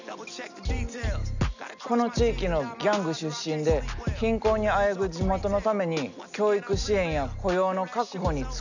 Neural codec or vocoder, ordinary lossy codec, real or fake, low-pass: none; none; real; 7.2 kHz